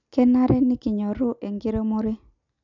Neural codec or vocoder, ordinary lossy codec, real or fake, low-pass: none; none; real; 7.2 kHz